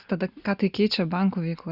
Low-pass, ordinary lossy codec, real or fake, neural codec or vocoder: 5.4 kHz; Opus, 64 kbps; real; none